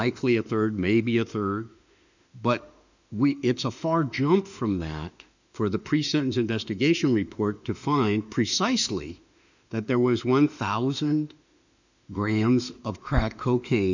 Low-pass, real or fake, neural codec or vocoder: 7.2 kHz; fake; autoencoder, 48 kHz, 32 numbers a frame, DAC-VAE, trained on Japanese speech